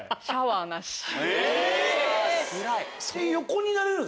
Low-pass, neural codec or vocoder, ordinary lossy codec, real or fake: none; none; none; real